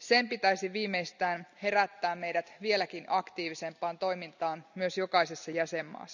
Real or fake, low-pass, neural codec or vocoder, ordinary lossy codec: real; 7.2 kHz; none; none